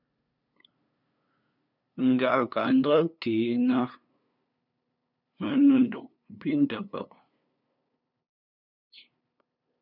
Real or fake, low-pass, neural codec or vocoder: fake; 5.4 kHz; codec, 16 kHz, 2 kbps, FunCodec, trained on LibriTTS, 25 frames a second